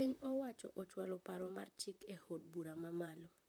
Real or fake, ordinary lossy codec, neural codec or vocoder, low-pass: fake; none; vocoder, 44.1 kHz, 128 mel bands, Pupu-Vocoder; none